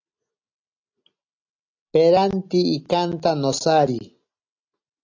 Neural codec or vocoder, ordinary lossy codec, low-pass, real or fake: none; AAC, 48 kbps; 7.2 kHz; real